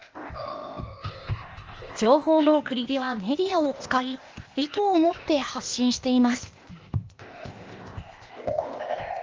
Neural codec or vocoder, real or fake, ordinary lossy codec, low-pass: codec, 16 kHz, 0.8 kbps, ZipCodec; fake; Opus, 24 kbps; 7.2 kHz